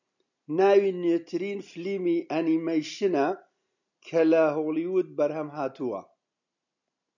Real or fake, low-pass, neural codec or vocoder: real; 7.2 kHz; none